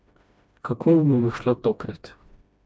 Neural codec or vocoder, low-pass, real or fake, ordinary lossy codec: codec, 16 kHz, 1 kbps, FreqCodec, smaller model; none; fake; none